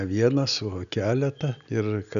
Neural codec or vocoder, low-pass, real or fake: none; 7.2 kHz; real